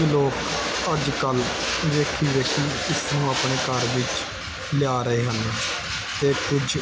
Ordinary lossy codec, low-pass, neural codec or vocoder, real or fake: none; none; none; real